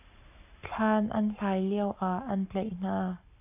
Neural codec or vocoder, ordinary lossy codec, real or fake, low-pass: codec, 44.1 kHz, 7.8 kbps, Pupu-Codec; AAC, 32 kbps; fake; 3.6 kHz